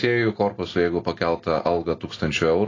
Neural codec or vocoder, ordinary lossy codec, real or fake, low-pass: none; AAC, 32 kbps; real; 7.2 kHz